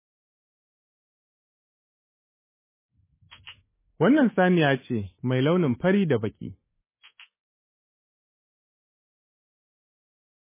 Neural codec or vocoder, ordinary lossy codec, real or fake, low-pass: codec, 24 kHz, 3.1 kbps, DualCodec; MP3, 16 kbps; fake; 3.6 kHz